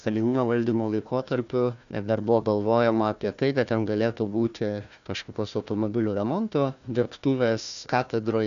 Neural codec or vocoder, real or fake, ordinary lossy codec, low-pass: codec, 16 kHz, 1 kbps, FunCodec, trained on Chinese and English, 50 frames a second; fake; MP3, 96 kbps; 7.2 kHz